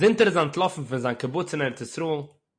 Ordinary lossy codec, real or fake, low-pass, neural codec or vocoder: MP3, 48 kbps; real; 9.9 kHz; none